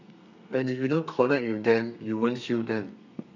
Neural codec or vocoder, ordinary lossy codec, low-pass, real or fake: codec, 32 kHz, 1.9 kbps, SNAC; none; 7.2 kHz; fake